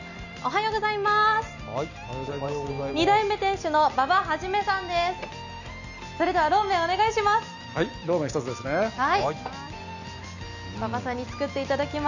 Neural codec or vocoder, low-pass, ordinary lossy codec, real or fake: none; 7.2 kHz; none; real